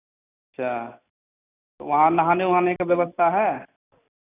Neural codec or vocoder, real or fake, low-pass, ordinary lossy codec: none; real; 3.6 kHz; none